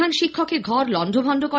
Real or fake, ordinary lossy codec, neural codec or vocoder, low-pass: real; none; none; none